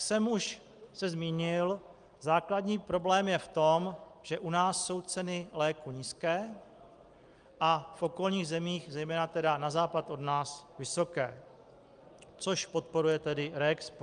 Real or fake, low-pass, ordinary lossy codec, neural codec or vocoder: real; 9.9 kHz; Opus, 32 kbps; none